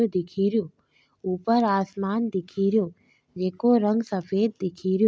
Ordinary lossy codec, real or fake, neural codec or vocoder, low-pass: none; real; none; none